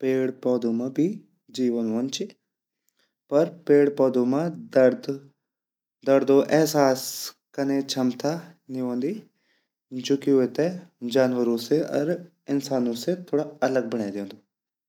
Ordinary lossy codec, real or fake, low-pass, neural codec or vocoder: none; real; 19.8 kHz; none